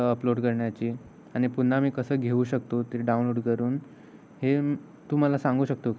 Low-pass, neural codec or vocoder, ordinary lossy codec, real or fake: none; none; none; real